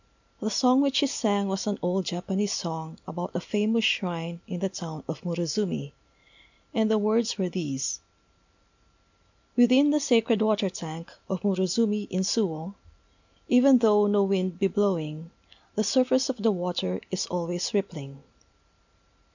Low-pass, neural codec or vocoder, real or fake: 7.2 kHz; none; real